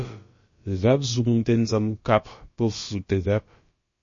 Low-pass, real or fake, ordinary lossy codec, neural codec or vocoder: 7.2 kHz; fake; MP3, 32 kbps; codec, 16 kHz, about 1 kbps, DyCAST, with the encoder's durations